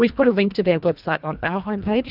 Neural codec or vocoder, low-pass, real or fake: codec, 24 kHz, 1.5 kbps, HILCodec; 5.4 kHz; fake